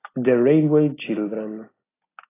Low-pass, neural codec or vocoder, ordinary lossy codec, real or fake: 3.6 kHz; none; AAC, 16 kbps; real